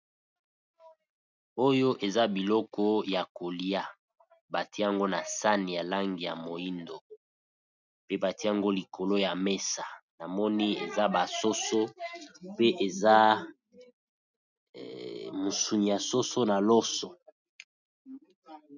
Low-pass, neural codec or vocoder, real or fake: 7.2 kHz; none; real